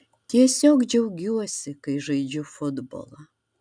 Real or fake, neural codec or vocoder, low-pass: real; none; 9.9 kHz